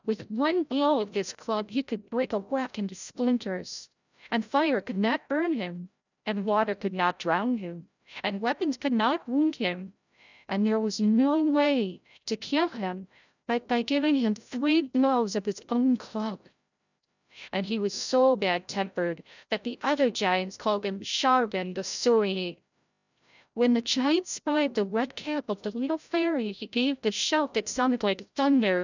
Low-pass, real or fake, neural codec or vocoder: 7.2 kHz; fake; codec, 16 kHz, 0.5 kbps, FreqCodec, larger model